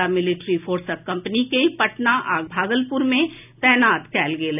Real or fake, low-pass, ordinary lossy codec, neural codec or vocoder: real; 3.6 kHz; none; none